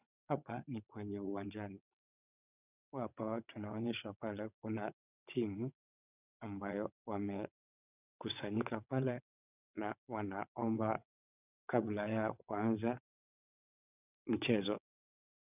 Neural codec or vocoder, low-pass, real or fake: vocoder, 22.05 kHz, 80 mel bands, WaveNeXt; 3.6 kHz; fake